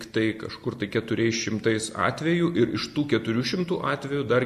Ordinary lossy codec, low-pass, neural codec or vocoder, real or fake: MP3, 64 kbps; 14.4 kHz; none; real